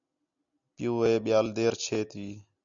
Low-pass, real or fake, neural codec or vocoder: 7.2 kHz; real; none